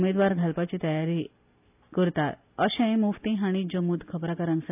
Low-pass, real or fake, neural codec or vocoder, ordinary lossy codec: 3.6 kHz; real; none; AAC, 32 kbps